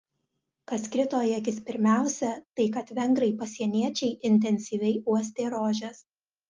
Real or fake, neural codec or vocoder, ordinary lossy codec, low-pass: real; none; Opus, 32 kbps; 7.2 kHz